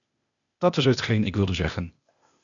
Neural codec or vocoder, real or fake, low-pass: codec, 16 kHz, 0.8 kbps, ZipCodec; fake; 7.2 kHz